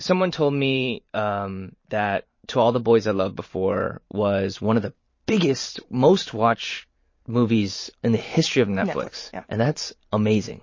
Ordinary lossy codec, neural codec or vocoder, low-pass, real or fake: MP3, 32 kbps; vocoder, 44.1 kHz, 128 mel bands every 512 samples, BigVGAN v2; 7.2 kHz; fake